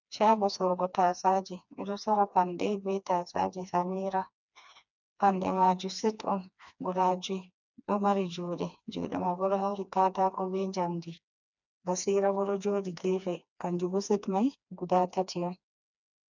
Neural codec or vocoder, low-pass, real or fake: codec, 16 kHz, 2 kbps, FreqCodec, smaller model; 7.2 kHz; fake